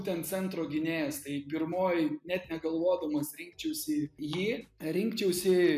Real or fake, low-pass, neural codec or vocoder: real; 14.4 kHz; none